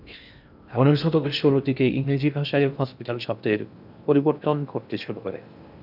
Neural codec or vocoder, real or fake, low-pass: codec, 16 kHz in and 24 kHz out, 0.6 kbps, FocalCodec, streaming, 2048 codes; fake; 5.4 kHz